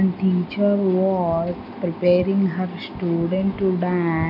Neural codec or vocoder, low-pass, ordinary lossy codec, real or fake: none; 5.4 kHz; none; real